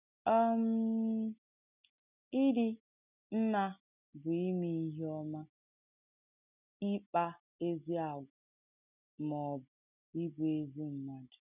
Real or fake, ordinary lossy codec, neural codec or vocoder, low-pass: real; none; none; 3.6 kHz